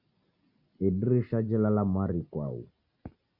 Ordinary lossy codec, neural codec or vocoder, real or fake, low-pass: Opus, 64 kbps; none; real; 5.4 kHz